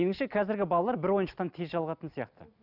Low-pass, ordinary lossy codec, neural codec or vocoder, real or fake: 5.4 kHz; none; none; real